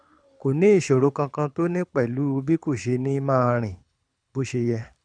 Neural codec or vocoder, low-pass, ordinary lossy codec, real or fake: codec, 24 kHz, 6 kbps, HILCodec; 9.9 kHz; none; fake